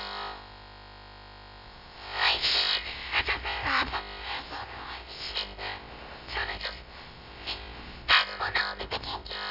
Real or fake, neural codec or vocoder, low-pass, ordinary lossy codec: fake; codec, 16 kHz, about 1 kbps, DyCAST, with the encoder's durations; 5.4 kHz; none